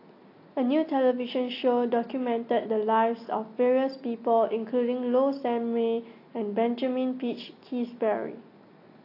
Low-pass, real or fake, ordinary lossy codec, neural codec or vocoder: 5.4 kHz; real; AAC, 32 kbps; none